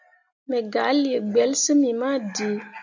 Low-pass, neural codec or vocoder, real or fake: 7.2 kHz; none; real